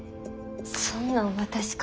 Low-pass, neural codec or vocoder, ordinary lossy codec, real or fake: none; none; none; real